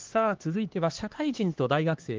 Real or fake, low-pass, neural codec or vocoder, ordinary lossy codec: fake; 7.2 kHz; codec, 16 kHz, 2 kbps, X-Codec, HuBERT features, trained on LibriSpeech; Opus, 16 kbps